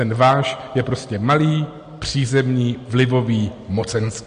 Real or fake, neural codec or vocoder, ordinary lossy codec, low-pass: real; none; MP3, 48 kbps; 9.9 kHz